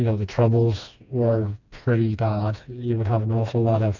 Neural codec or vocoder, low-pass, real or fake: codec, 16 kHz, 2 kbps, FreqCodec, smaller model; 7.2 kHz; fake